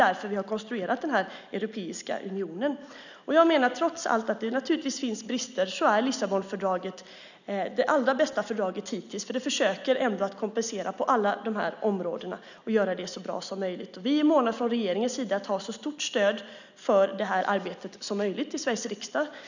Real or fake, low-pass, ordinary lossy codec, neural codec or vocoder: real; 7.2 kHz; none; none